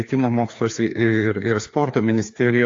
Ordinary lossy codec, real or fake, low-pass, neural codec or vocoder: AAC, 48 kbps; fake; 7.2 kHz; codec, 16 kHz, 2 kbps, FreqCodec, larger model